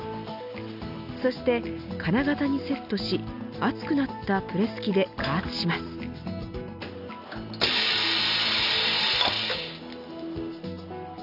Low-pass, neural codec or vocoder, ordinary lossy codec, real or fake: 5.4 kHz; none; none; real